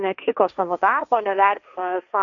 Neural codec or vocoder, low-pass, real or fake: codec, 16 kHz, 1.1 kbps, Voila-Tokenizer; 7.2 kHz; fake